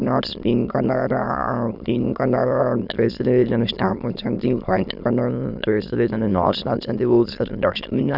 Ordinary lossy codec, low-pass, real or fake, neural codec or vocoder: none; 5.4 kHz; fake; autoencoder, 22.05 kHz, a latent of 192 numbers a frame, VITS, trained on many speakers